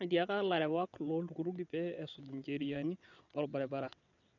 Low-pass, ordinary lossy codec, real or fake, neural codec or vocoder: 7.2 kHz; none; fake; vocoder, 22.05 kHz, 80 mel bands, WaveNeXt